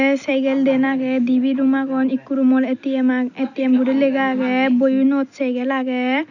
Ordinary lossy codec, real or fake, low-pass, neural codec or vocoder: none; real; 7.2 kHz; none